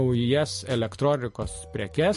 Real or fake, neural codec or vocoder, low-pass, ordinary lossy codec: real; none; 14.4 kHz; MP3, 48 kbps